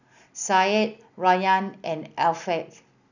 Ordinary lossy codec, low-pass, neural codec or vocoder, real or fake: none; 7.2 kHz; none; real